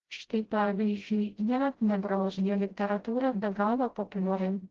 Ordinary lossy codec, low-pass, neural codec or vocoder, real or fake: Opus, 16 kbps; 7.2 kHz; codec, 16 kHz, 0.5 kbps, FreqCodec, smaller model; fake